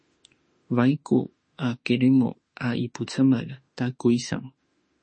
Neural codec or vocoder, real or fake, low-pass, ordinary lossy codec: autoencoder, 48 kHz, 32 numbers a frame, DAC-VAE, trained on Japanese speech; fake; 9.9 kHz; MP3, 32 kbps